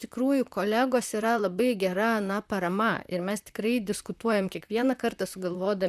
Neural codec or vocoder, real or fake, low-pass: vocoder, 44.1 kHz, 128 mel bands, Pupu-Vocoder; fake; 14.4 kHz